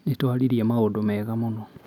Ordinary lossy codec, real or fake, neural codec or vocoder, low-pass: none; real; none; 19.8 kHz